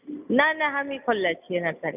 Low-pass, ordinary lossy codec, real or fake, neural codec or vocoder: 3.6 kHz; none; real; none